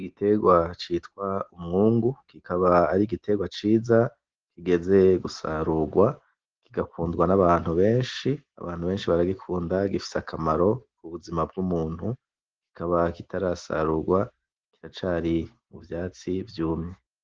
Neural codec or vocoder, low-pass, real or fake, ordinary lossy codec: none; 7.2 kHz; real; Opus, 16 kbps